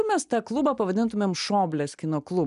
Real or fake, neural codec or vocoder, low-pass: real; none; 10.8 kHz